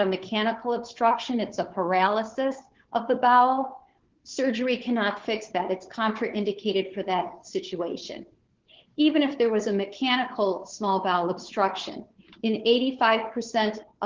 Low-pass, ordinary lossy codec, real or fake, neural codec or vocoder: 7.2 kHz; Opus, 16 kbps; fake; codec, 16 kHz, 4 kbps, FunCodec, trained on Chinese and English, 50 frames a second